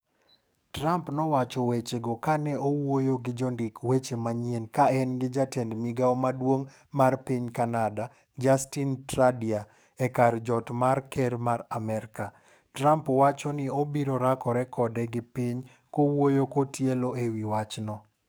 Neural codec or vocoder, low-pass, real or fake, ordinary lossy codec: codec, 44.1 kHz, 7.8 kbps, DAC; none; fake; none